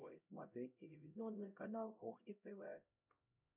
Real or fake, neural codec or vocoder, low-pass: fake; codec, 16 kHz, 0.5 kbps, X-Codec, HuBERT features, trained on LibriSpeech; 3.6 kHz